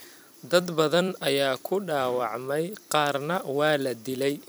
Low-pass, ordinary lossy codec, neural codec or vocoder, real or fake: none; none; vocoder, 44.1 kHz, 128 mel bands every 256 samples, BigVGAN v2; fake